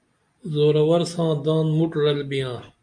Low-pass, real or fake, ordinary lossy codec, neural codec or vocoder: 9.9 kHz; real; MP3, 64 kbps; none